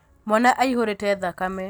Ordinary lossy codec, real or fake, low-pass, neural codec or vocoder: none; real; none; none